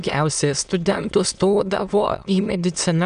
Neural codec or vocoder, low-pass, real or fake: autoencoder, 22.05 kHz, a latent of 192 numbers a frame, VITS, trained on many speakers; 9.9 kHz; fake